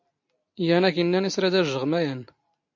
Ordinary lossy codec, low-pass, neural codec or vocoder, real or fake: MP3, 48 kbps; 7.2 kHz; none; real